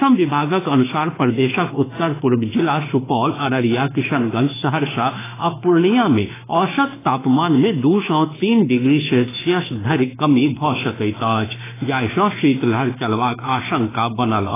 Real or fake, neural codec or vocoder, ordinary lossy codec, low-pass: fake; autoencoder, 48 kHz, 32 numbers a frame, DAC-VAE, trained on Japanese speech; AAC, 16 kbps; 3.6 kHz